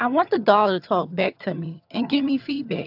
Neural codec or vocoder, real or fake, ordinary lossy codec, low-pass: vocoder, 22.05 kHz, 80 mel bands, HiFi-GAN; fake; AAC, 48 kbps; 5.4 kHz